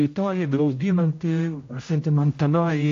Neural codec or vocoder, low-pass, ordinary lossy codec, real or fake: codec, 16 kHz, 0.5 kbps, X-Codec, HuBERT features, trained on general audio; 7.2 kHz; AAC, 48 kbps; fake